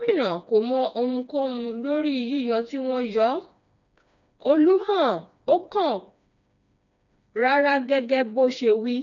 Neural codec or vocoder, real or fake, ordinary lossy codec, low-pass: codec, 16 kHz, 2 kbps, FreqCodec, smaller model; fake; none; 7.2 kHz